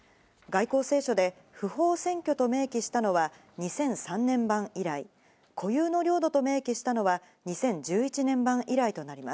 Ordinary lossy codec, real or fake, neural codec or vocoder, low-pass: none; real; none; none